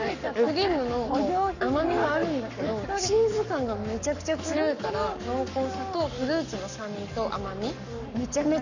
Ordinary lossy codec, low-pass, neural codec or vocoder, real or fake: none; 7.2 kHz; codec, 44.1 kHz, 7.8 kbps, Pupu-Codec; fake